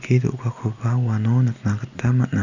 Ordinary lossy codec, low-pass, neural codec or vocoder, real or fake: none; 7.2 kHz; none; real